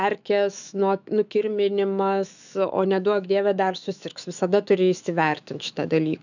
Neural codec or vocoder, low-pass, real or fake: autoencoder, 48 kHz, 128 numbers a frame, DAC-VAE, trained on Japanese speech; 7.2 kHz; fake